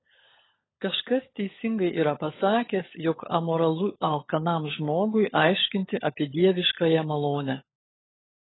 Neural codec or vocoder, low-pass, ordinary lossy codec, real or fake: codec, 16 kHz, 16 kbps, FunCodec, trained on LibriTTS, 50 frames a second; 7.2 kHz; AAC, 16 kbps; fake